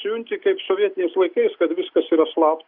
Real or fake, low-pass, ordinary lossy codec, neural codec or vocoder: real; 5.4 kHz; Opus, 64 kbps; none